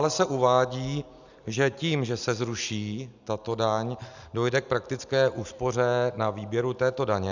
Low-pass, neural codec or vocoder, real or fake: 7.2 kHz; none; real